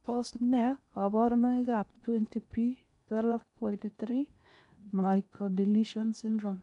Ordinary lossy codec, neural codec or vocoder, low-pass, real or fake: none; codec, 16 kHz in and 24 kHz out, 0.8 kbps, FocalCodec, streaming, 65536 codes; 10.8 kHz; fake